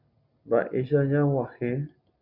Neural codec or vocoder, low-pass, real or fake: none; 5.4 kHz; real